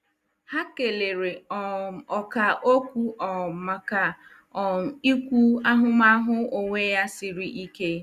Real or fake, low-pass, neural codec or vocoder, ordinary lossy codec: real; 14.4 kHz; none; Opus, 64 kbps